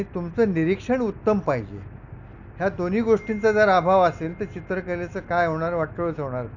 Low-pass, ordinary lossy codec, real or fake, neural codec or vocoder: 7.2 kHz; none; real; none